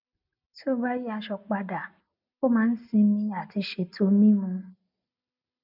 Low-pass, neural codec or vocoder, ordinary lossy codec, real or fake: 5.4 kHz; none; none; real